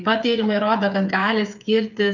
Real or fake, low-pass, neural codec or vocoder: fake; 7.2 kHz; codec, 16 kHz, 8 kbps, FreqCodec, smaller model